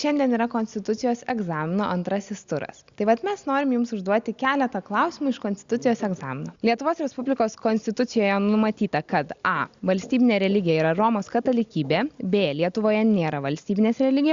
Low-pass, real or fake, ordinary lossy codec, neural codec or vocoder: 7.2 kHz; real; Opus, 64 kbps; none